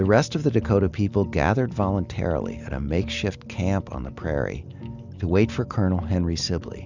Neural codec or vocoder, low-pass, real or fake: none; 7.2 kHz; real